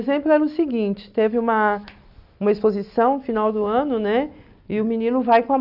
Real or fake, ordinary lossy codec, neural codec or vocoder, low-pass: real; none; none; 5.4 kHz